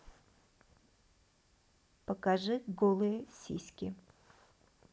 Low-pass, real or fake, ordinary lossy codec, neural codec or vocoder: none; real; none; none